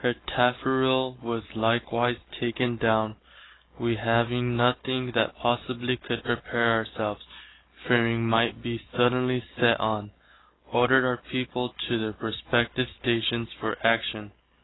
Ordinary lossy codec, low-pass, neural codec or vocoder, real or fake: AAC, 16 kbps; 7.2 kHz; none; real